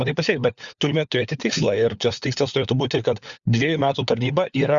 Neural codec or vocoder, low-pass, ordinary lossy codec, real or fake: codec, 16 kHz, 4 kbps, FunCodec, trained on LibriTTS, 50 frames a second; 7.2 kHz; Opus, 64 kbps; fake